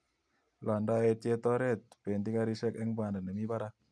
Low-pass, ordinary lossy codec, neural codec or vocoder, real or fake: none; none; none; real